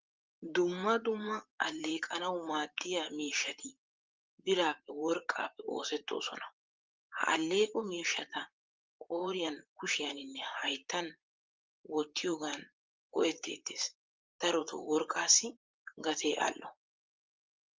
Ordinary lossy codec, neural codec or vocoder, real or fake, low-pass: Opus, 32 kbps; vocoder, 44.1 kHz, 128 mel bands, Pupu-Vocoder; fake; 7.2 kHz